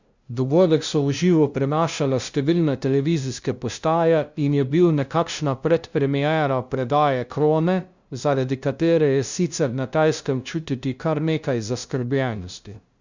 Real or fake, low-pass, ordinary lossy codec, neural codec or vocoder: fake; 7.2 kHz; Opus, 64 kbps; codec, 16 kHz, 0.5 kbps, FunCodec, trained on LibriTTS, 25 frames a second